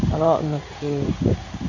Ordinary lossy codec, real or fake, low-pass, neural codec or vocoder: none; fake; 7.2 kHz; codec, 16 kHz in and 24 kHz out, 1 kbps, XY-Tokenizer